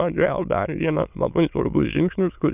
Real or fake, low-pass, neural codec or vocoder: fake; 3.6 kHz; autoencoder, 22.05 kHz, a latent of 192 numbers a frame, VITS, trained on many speakers